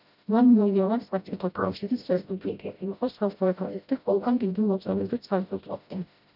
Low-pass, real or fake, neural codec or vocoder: 5.4 kHz; fake; codec, 16 kHz, 0.5 kbps, FreqCodec, smaller model